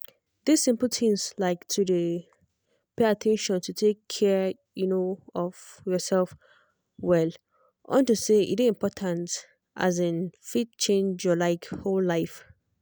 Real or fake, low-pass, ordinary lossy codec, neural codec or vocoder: real; none; none; none